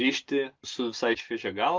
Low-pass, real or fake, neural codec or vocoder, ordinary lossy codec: 7.2 kHz; real; none; Opus, 32 kbps